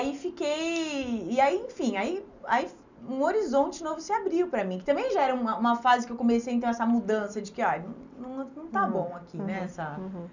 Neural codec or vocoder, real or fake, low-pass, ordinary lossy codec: none; real; 7.2 kHz; none